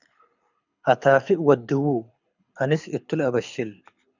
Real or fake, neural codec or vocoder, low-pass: fake; codec, 24 kHz, 6 kbps, HILCodec; 7.2 kHz